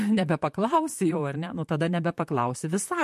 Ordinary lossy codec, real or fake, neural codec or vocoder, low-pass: MP3, 64 kbps; fake; vocoder, 44.1 kHz, 128 mel bands, Pupu-Vocoder; 14.4 kHz